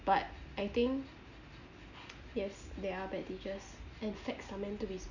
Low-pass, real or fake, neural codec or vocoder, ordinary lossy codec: 7.2 kHz; real; none; none